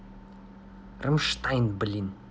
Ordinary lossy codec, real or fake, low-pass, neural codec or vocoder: none; real; none; none